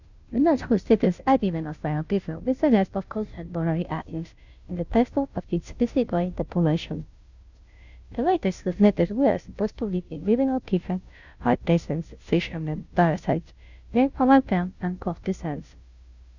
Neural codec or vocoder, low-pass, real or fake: codec, 16 kHz, 0.5 kbps, FunCodec, trained on Chinese and English, 25 frames a second; 7.2 kHz; fake